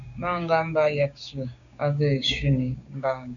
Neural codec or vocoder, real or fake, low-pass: codec, 16 kHz, 6 kbps, DAC; fake; 7.2 kHz